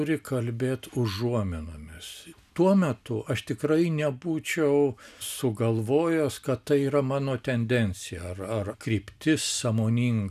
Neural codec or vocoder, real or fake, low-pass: none; real; 14.4 kHz